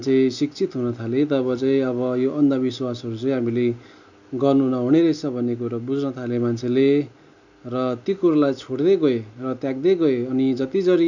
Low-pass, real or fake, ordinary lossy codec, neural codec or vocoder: 7.2 kHz; real; none; none